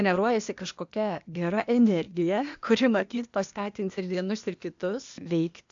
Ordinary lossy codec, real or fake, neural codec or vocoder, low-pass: AAC, 64 kbps; fake; codec, 16 kHz, 0.8 kbps, ZipCodec; 7.2 kHz